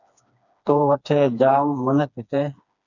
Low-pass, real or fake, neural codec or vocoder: 7.2 kHz; fake; codec, 16 kHz, 2 kbps, FreqCodec, smaller model